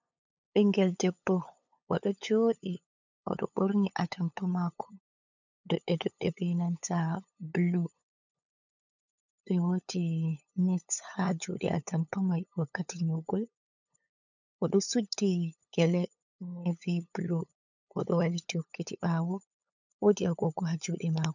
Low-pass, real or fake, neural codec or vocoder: 7.2 kHz; fake; codec, 16 kHz, 8 kbps, FunCodec, trained on LibriTTS, 25 frames a second